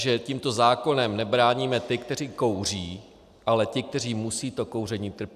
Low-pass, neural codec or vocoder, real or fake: 14.4 kHz; none; real